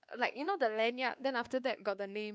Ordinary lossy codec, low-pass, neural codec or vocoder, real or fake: none; none; codec, 16 kHz, 2 kbps, X-Codec, WavLM features, trained on Multilingual LibriSpeech; fake